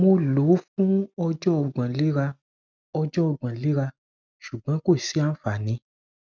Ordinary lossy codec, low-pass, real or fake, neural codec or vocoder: none; 7.2 kHz; real; none